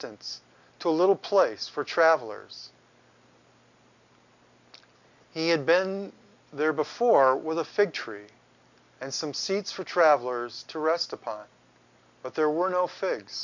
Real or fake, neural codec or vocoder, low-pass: real; none; 7.2 kHz